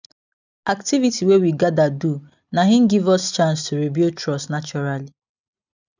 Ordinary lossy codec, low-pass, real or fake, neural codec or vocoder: none; 7.2 kHz; real; none